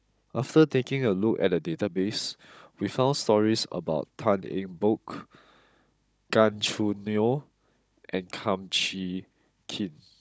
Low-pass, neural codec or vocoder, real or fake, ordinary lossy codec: none; codec, 16 kHz, 16 kbps, FunCodec, trained on Chinese and English, 50 frames a second; fake; none